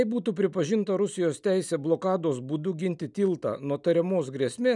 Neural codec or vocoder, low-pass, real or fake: none; 10.8 kHz; real